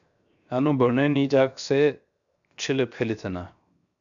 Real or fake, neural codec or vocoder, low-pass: fake; codec, 16 kHz, 0.7 kbps, FocalCodec; 7.2 kHz